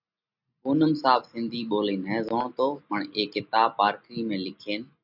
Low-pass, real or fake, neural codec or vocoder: 5.4 kHz; real; none